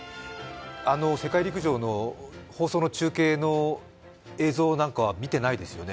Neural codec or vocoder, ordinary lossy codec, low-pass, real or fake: none; none; none; real